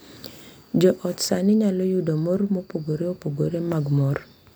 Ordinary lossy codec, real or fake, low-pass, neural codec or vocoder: none; real; none; none